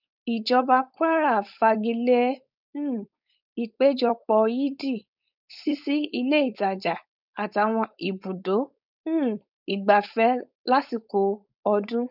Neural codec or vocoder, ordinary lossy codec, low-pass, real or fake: codec, 16 kHz, 4.8 kbps, FACodec; none; 5.4 kHz; fake